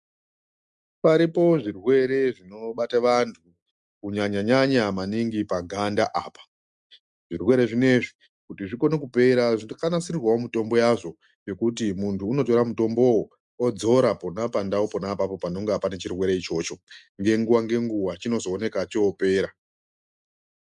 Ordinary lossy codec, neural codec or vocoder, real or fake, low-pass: MP3, 96 kbps; none; real; 10.8 kHz